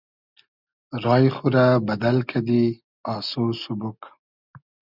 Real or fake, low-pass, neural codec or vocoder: real; 5.4 kHz; none